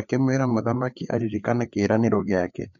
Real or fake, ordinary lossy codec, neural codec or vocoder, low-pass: fake; none; codec, 16 kHz, 4 kbps, FreqCodec, larger model; 7.2 kHz